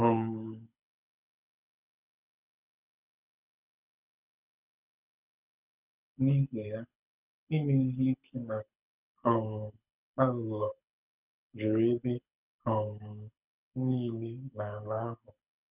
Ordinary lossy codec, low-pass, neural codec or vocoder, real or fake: none; 3.6 kHz; codec, 24 kHz, 6 kbps, HILCodec; fake